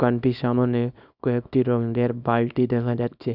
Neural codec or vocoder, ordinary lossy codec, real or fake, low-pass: codec, 24 kHz, 0.9 kbps, WavTokenizer, small release; none; fake; 5.4 kHz